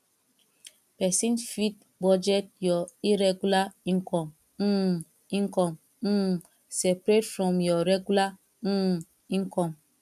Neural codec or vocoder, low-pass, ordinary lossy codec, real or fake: none; 14.4 kHz; none; real